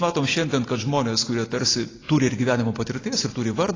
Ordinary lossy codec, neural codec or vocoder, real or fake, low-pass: AAC, 32 kbps; none; real; 7.2 kHz